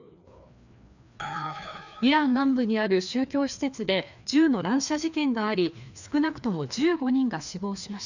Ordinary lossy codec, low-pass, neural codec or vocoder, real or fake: none; 7.2 kHz; codec, 16 kHz, 2 kbps, FreqCodec, larger model; fake